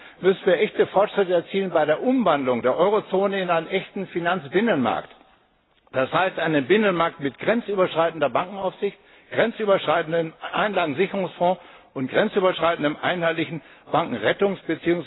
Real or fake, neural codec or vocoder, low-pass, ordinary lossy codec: real; none; 7.2 kHz; AAC, 16 kbps